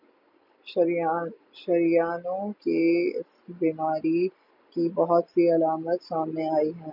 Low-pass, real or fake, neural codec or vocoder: 5.4 kHz; real; none